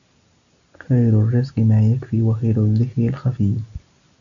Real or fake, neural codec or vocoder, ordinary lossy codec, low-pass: real; none; MP3, 96 kbps; 7.2 kHz